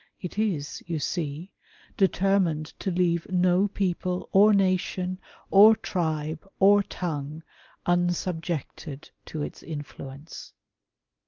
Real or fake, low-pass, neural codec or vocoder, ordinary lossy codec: real; 7.2 kHz; none; Opus, 24 kbps